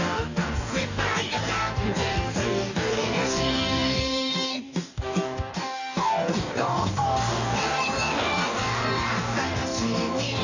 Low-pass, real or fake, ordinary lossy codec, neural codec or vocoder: 7.2 kHz; fake; none; codec, 44.1 kHz, 2.6 kbps, DAC